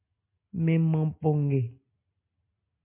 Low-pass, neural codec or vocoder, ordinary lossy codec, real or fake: 3.6 kHz; none; MP3, 24 kbps; real